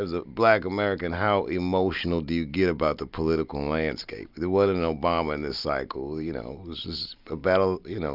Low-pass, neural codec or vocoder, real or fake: 5.4 kHz; none; real